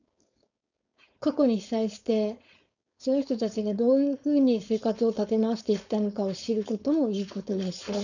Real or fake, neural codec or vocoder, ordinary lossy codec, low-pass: fake; codec, 16 kHz, 4.8 kbps, FACodec; none; 7.2 kHz